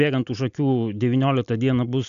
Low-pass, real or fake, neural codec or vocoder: 7.2 kHz; real; none